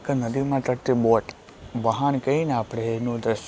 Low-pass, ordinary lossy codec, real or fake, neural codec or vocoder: none; none; real; none